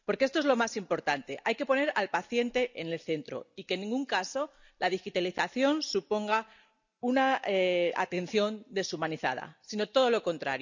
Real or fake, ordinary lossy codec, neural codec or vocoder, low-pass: real; none; none; 7.2 kHz